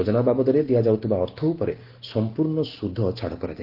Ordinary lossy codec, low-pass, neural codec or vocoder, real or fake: Opus, 16 kbps; 5.4 kHz; none; real